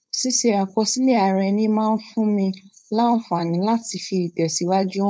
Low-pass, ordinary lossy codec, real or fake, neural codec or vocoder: none; none; fake; codec, 16 kHz, 4.8 kbps, FACodec